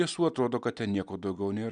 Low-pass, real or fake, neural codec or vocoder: 9.9 kHz; real; none